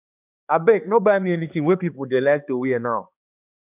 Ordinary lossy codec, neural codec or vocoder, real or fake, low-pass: none; codec, 16 kHz, 2 kbps, X-Codec, HuBERT features, trained on balanced general audio; fake; 3.6 kHz